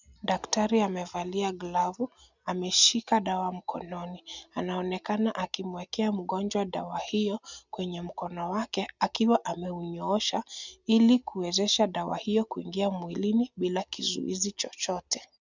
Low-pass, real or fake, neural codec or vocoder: 7.2 kHz; real; none